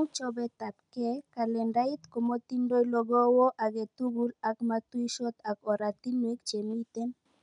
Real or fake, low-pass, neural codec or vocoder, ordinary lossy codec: real; 9.9 kHz; none; none